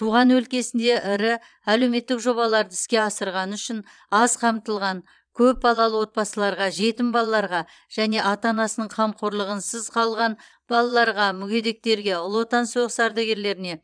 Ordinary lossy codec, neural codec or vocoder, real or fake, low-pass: none; vocoder, 22.05 kHz, 80 mel bands, Vocos; fake; 9.9 kHz